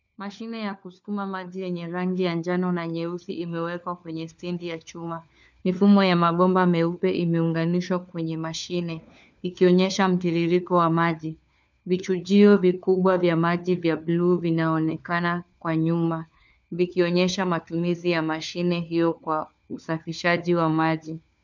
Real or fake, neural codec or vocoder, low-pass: fake; codec, 16 kHz, 4 kbps, FunCodec, trained on LibriTTS, 50 frames a second; 7.2 kHz